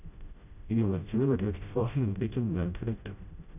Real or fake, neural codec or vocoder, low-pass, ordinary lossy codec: fake; codec, 16 kHz, 0.5 kbps, FreqCodec, smaller model; 3.6 kHz; none